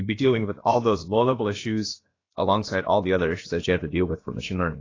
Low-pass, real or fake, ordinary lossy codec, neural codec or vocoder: 7.2 kHz; fake; AAC, 32 kbps; codec, 16 kHz, about 1 kbps, DyCAST, with the encoder's durations